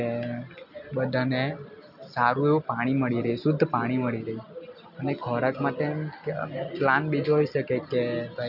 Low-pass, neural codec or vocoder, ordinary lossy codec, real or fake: 5.4 kHz; none; none; real